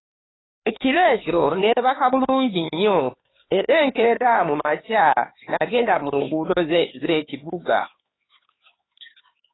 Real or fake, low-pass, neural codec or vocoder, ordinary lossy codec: fake; 7.2 kHz; codec, 16 kHz, 4 kbps, X-Codec, HuBERT features, trained on LibriSpeech; AAC, 16 kbps